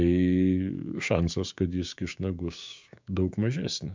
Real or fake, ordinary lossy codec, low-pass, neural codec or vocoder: fake; MP3, 64 kbps; 7.2 kHz; codec, 16 kHz, 6 kbps, DAC